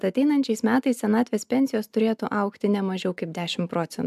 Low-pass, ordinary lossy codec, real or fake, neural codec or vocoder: 14.4 kHz; MP3, 96 kbps; real; none